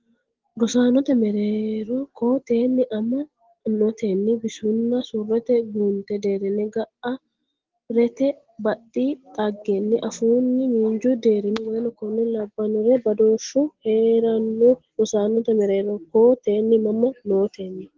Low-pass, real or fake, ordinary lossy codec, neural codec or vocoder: 7.2 kHz; real; Opus, 16 kbps; none